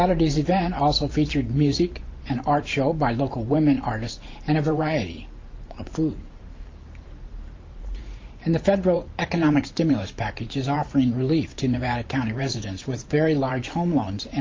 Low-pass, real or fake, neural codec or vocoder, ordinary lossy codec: 7.2 kHz; fake; vocoder, 44.1 kHz, 128 mel bands every 512 samples, BigVGAN v2; Opus, 32 kbps